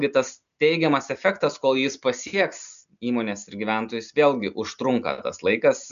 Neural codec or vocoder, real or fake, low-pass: none; real; 7.2 kHz